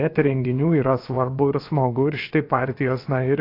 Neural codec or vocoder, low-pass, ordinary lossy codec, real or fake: codec, 16 kHz, about 1 kbps, DyCAST, with the encoder's durations; 5.4 kHz; AAC, 32 kbps; fake